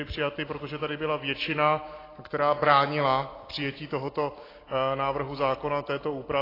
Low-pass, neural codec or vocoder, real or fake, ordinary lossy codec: 5.4 kHz; none; real; AAC, 24 kbps